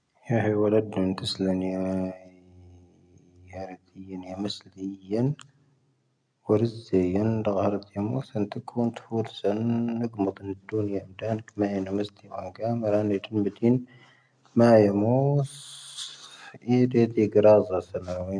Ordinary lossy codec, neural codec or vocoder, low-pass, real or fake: none; none; 9.9 kHz; real